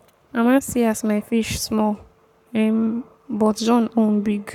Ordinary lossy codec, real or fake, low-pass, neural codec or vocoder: none; fake; 19.8 kHz; codec, 44.1 kHz, 7.8 kbps, Pupu-Codec